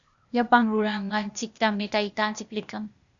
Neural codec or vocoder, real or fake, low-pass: codec, 16 kHz, 0.8 kbps, ZipCodec; fake; 7.2 kHz